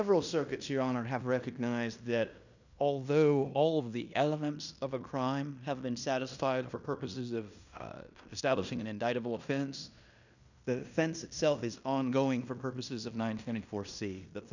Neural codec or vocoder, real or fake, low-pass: codec, 16 kHz in and 24 kHz out, 0.9 kbps, LongCat-Audio-Codec, fine tuned four codebook decoder; fake; 7.2 kHz